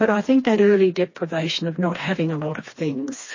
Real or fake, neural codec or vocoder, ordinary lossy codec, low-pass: fake; codec, 16 kHz, 2 kbps, FreqCodec, smaller model; MP3, 32 kbps; 7.2 kHz